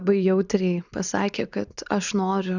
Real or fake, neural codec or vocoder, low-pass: real; none; 7.2 kHz